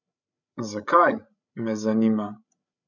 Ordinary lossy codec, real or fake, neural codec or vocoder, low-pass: none; fake; codec, 16 kHz, 16 kbps, FreqCodec, larger model; 7.2 kHz